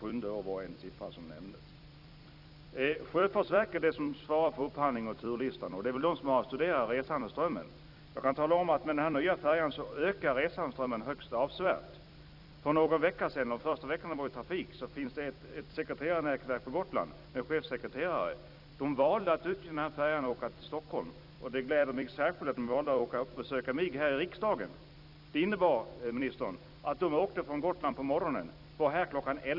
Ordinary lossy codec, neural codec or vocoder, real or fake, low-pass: none; vocoder, 44.1 kHz, 128 mel bands every 256 samples, BigVGAN v2; fake; 5.4 kHz